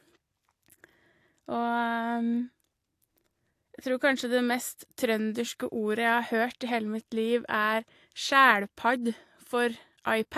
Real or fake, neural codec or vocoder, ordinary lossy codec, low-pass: real; none; AAC, 64 kbps; 14.4 kHz